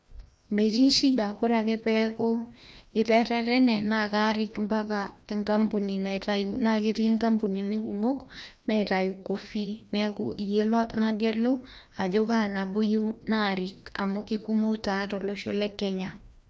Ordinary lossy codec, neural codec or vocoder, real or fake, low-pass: none; codec, 16 kHz, 1 kbps, FreqCodec, larger model; fake; none